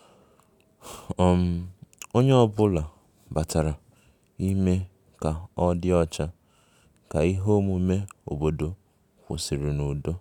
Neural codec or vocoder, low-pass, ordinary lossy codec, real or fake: none; 19.8 kHz; none; real